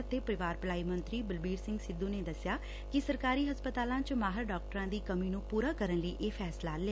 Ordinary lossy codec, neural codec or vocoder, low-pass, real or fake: none; none; none; real